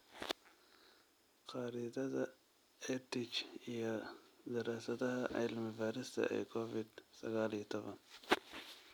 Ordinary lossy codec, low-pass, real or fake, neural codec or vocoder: none; none; real; none